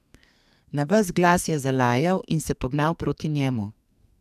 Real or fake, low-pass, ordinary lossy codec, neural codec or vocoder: fake; 14.4 kHz; none; codec, 44.1 kHz, 2.6 kbps, SNAC